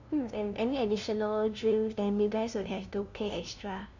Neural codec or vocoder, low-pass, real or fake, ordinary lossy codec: codec, 16 kHz, 0.5 kbps, FunCodec, trained on LibriTTS, 25 frames a second; 7.2 kHz; fake; none